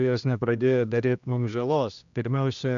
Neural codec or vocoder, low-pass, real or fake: codec, 16 kHz, 1 kbps, X-Codec, HuBERT features, trained on general audio; 7.2 kHz; fake